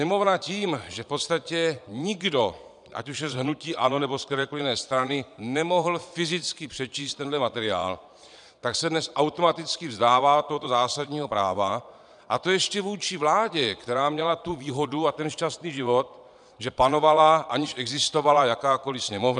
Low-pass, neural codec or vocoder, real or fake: 9.9 kHz; vocoder, 22.05 kHz, 80 mel bands, Vocos; fake